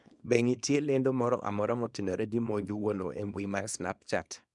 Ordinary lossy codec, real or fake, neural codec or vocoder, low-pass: none; fake; codec, 24 kHz, 0.9 kbps, WavTokenizer, small release; 10.8 kHz